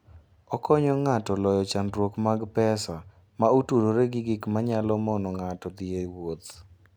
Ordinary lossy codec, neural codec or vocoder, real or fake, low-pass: none; none; real; none